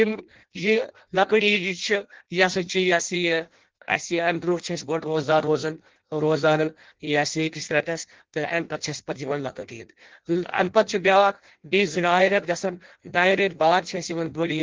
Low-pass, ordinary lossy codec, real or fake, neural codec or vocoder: 7.2 kHz; Opus, 16 kbps; fake; codec, 16 kHz in and 24 kHz out, 0.6 kbps, FireRedTTS-2 codec